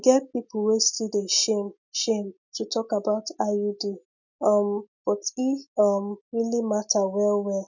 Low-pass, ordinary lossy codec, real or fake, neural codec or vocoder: 7.2 kHz; none; real; none